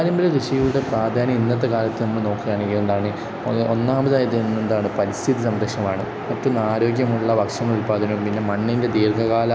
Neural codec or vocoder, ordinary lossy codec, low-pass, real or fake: none; none; none; real